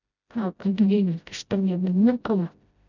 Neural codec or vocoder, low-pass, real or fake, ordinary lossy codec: codec, 16 kHz, 0.5 kbps, FreqCodec, smaller model; 7.2 kHz; fake; none